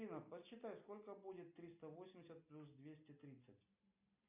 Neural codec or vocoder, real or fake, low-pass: none; real; 3.6 kHz